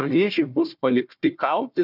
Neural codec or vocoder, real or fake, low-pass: codec, 16 kHz, 1 kbps, FunCodec, trained on Chinese and English, 50 frames a second; fake; 5.4 kHz